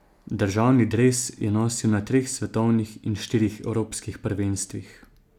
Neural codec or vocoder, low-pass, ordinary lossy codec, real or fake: none; 19.8 kHz; none; real